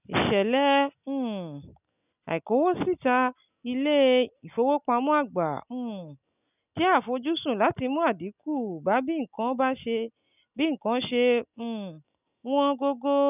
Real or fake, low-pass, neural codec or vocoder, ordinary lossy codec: real; 3.6 kHz; none; none